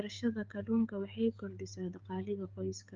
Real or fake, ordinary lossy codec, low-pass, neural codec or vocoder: fake; Opus, 24 kbps; 7.2 kHz; codec, 16 kHz, 16 kbps, FreqCodec, smaller model